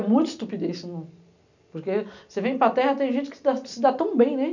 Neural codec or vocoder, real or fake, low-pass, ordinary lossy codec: none; real; 7.2 kHz; none